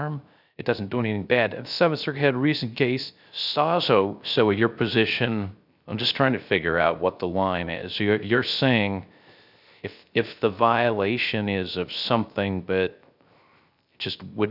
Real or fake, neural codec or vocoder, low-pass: fake; codec, 16 kHz, 0.3 kbps, FocalCodec; 5.4 kHz